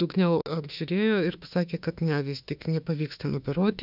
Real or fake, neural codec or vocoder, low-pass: fake; autoencoder, 48 kHz, 32 numbers a frame, DAC-VAE, trained on Japanese speech; 5.4 kHz